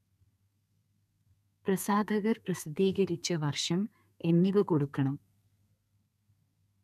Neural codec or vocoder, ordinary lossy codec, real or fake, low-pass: codec, 32 kHz, 1.9 kbps, SNAC; none; fake; 14.4 kHz